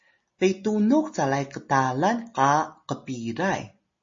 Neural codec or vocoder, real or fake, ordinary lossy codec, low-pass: none; real; MP3, 32 kbps; 7.2 kHz